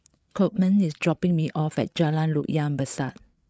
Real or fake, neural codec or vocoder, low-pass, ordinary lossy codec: fake; codec, 16 kHz, 8 kbps, FreqCodec, larger model; none; none